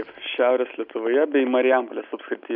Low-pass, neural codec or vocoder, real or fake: 5.4 kHz; none; real